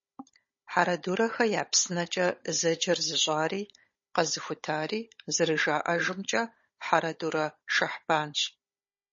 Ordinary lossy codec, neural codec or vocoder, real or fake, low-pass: MP3, 32 kbps; codec, 16 kHz, 16 kbps, FunCodec, trained on Chinese and English, 50 frames a second; fake; 7.2 kHz